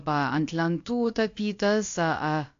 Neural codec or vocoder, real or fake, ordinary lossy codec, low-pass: codec, 16 kHz, about 1 kbps, DyCAST, with the encoder's durations; fake; AAC, 48 kbps; 7.2 kHz